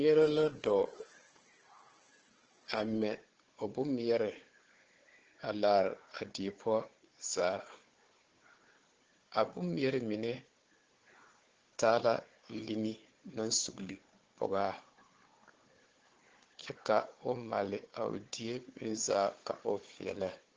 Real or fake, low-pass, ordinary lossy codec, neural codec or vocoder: fake; 7.2 kHz; Opus, 16 kbps; codec, 16 kHz, 4 kbps, FunCodec, trained on Chinese and English, 50 frames a second